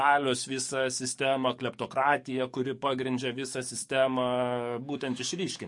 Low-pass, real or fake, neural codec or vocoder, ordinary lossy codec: 10.8 kHz; fake; codec, 44.1 kHz, 7.8 kbps, DAC; MP3, 48 kbps